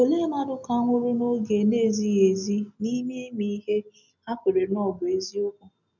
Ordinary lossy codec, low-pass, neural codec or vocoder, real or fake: none; 7.2 kHz; none; real